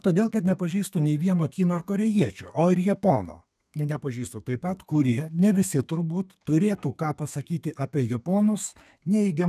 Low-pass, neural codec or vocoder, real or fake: 14.4 kHz; codec, 32 kHz, 1.9 kbps, SNAC; fake